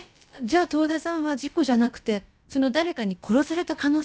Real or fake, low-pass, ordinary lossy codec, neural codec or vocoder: fake; none; none; codec, 16 kHz, about 1 kbps, DyCAST, with the encoder's durations